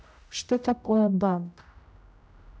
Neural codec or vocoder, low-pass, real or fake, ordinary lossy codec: codec, 16 kHz, 0.5 kbps, X-Codec, HuBERT features, trained on general audio; none; fake; none